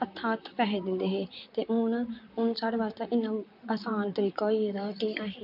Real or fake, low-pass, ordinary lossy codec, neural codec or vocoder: fake; 5.4 kHz; MP3, 48 kbps; vocoder, 44.1 kHz, 128 mel bands, Pupu-Vocoder